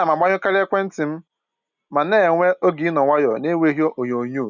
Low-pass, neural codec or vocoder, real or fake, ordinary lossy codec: 7.2 kHz; none; real; none